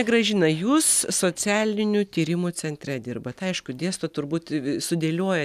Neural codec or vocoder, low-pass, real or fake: none; 14.4 kHz; real